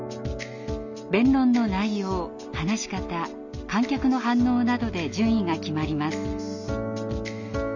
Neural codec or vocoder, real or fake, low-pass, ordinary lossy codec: none; real; 7.2 kHz; none